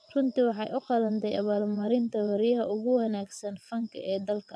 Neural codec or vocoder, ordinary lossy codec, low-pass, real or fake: vocoder, 22.05 kHz, 80 mel bands, WaveNeXt; none; none; fake